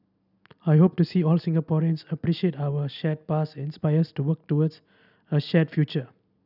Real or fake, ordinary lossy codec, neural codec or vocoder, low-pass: real; none; none; 5.4 kHz